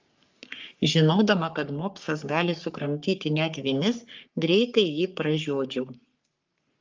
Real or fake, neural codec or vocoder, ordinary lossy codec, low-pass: fake; codec, 44.1 kHz, 3.4 kbps, Pupu-Codec; Opus, 32 kbps; 7.2 kHz